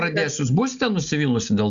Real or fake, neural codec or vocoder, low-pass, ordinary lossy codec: real; none; 7.2 kHz; Opus, 64 kbps